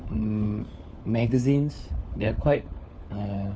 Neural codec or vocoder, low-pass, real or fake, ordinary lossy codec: codec, 16 kHz, 4 kbps, FunCodec, trained on LibriTTS, 50 frames a second; none; fake; none